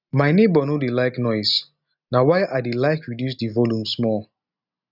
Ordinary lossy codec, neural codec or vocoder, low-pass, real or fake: none; none; 5.4 kHz; real